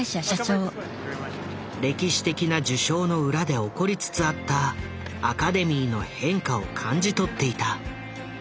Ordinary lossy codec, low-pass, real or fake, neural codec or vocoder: none; none; real; none